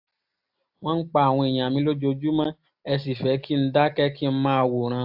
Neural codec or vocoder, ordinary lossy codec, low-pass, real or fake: none; AAC, 48 kbps; 5.4 kHz; real